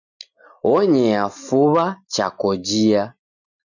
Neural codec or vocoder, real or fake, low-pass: none; real; 7.2 kHz